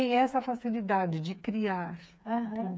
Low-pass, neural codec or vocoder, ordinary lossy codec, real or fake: none; codec, 16 kHz, 4 kbps, FreqCodec, smaller model; none; fake